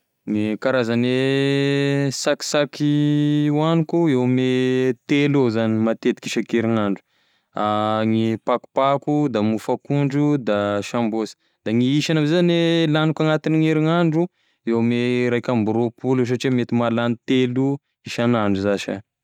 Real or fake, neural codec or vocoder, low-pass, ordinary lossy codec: real; none; 19.8 kHz; none